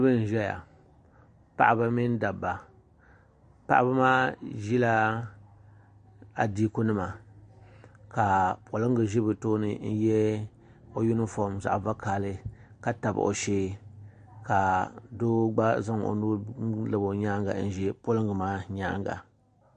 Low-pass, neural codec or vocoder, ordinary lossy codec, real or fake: 9.9 kHz; none; MP3, 48 kbps; real